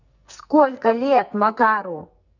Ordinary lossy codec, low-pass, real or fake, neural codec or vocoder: none; 7.2 kHz; fake; codec, 44.1 kHz, 2.6 kbps, SNAC